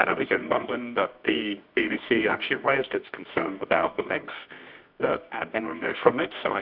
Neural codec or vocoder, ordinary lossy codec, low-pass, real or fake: codec, 24 kHz, 0.9 kbps, WavTokenizer, medium music audio release; AAC, 48 kbps; 5.4 kHz; fake